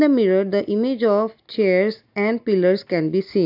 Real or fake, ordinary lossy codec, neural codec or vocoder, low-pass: real; AAC, 48 kbps; none; 5.4 kHz